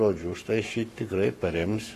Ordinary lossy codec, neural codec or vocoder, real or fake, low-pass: AAC, 48 kbps; codec, 44.1 kHz, 7.8 kbps, Pupu-Codec; fake; 14.4 kHz